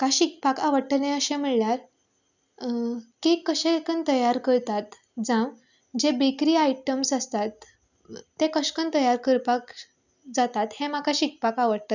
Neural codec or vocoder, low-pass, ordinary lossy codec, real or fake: none; 7.2 kHz; none; real